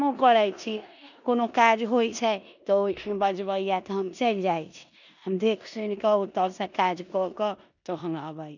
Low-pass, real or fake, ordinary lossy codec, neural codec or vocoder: 7.2 kHz; fake; none; codec, 16 kHz in and 24 kHz out, 0.9 kbps, LongCat-Audio-Codec, four codebook decoder